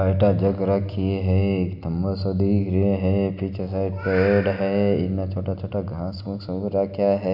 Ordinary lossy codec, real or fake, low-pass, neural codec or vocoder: none; real; 5.4 kHz; none